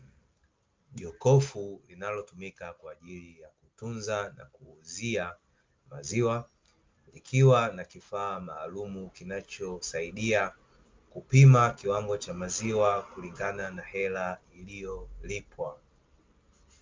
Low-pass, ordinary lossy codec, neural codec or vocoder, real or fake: 7.2 kHz; Opus, 24 kbps; none; real